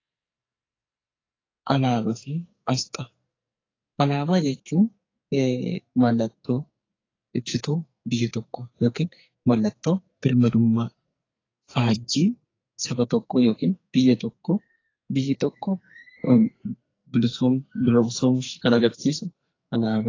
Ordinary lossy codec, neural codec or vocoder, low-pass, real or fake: AAC, 32 kbps; codec, 44.1 kHz, 2.6 kbps, SNAC; 7.2 kHz; fake